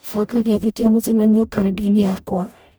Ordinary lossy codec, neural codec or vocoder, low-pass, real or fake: none; codec, 44.1 kHz, 0.9 kbps, DAC; none; fake